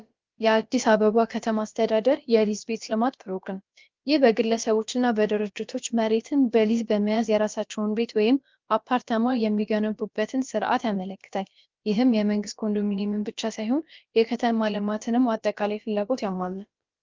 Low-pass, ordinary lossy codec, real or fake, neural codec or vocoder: 7.2 kHz; Opus, 32 kbps; fake; codec, 16 kHz, about 1 kbps, DyCAST, with the encoder's durations